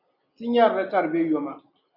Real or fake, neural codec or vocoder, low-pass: real; none; 5.4 kHz